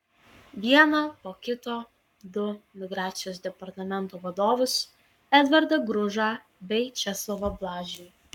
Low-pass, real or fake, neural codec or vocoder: 19.8 kHz; fake; codec, 44.1 kHz, 7.8 kbps, Pupu-Codec